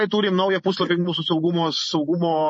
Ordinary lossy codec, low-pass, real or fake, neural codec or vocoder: MP3, 32 kbps; 10.8 kHz; fake; vocoder, 44.1 kHz, 128 mel bands every 512 samples, BigVGAN v2